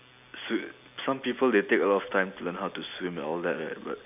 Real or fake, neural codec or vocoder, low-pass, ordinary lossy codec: real; none; 3.6 kHz; none